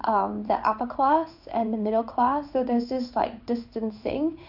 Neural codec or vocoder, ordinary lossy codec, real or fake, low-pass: codec, 16 kHz in and 24 kHz out, 1 kbps, XY-Tokenizer; none; fake; 5.4 kHz